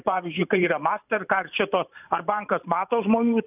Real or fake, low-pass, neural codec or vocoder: fake; 3.6 kHz; vocoder, 44.1 kHz, 128 mel bands every 512 samples, BigVGAN v2